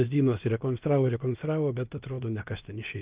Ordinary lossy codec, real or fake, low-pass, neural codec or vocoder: Opus, 32 kbps; fake; 3.6 kHz; codec, 16 kHz, about 1 kbps, DyCAST, with the encoder's durations